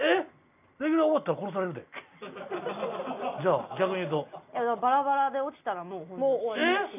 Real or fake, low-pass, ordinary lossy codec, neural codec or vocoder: real; 3.6 kHz; none; none